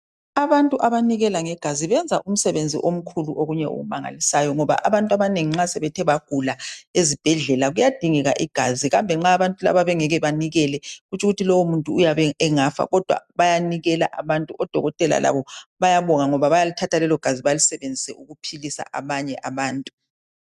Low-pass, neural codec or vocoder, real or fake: 14.4 kHz; none; real